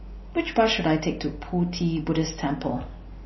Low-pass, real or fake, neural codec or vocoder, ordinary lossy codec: 7.2 kHz; real; none; MP3, 24 kbps